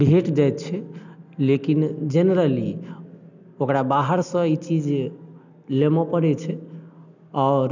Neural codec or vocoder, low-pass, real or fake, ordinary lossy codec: none; 7.2 kHz; real; none